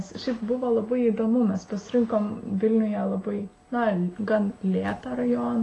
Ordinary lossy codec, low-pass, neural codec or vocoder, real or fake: AAC, 32 kbps; 10.8 kHz; none; real